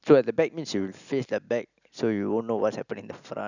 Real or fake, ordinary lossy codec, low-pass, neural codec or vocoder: real; none; 7.2 kHz; none